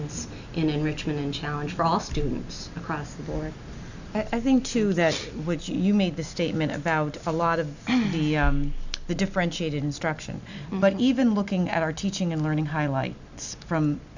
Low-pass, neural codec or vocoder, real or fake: 7.2 kHz; none; real